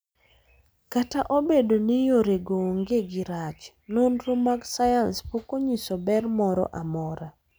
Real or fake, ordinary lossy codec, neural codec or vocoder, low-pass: real; none; none; none